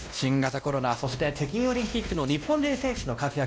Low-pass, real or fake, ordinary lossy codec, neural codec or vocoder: none; fake; none; codec, 16 kHz, 1 kbps, X-Codec, WavLM features, trained on Multilingual LibriSpeech